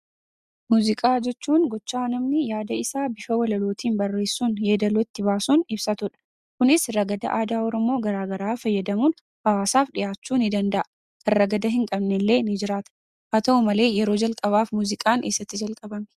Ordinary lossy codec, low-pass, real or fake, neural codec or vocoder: Opus, 64 kbps; 14.4 kHz; real; none